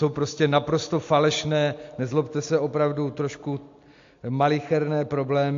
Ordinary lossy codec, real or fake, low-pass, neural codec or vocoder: AAC, 48 kbps; real; 7.2 kHz; none